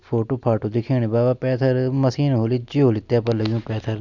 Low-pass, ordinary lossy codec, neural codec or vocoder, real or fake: 7.2 kHz; none; none; real